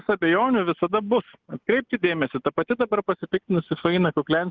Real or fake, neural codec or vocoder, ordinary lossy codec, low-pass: real; none; Opus, 32 kbps; 7.2 kHz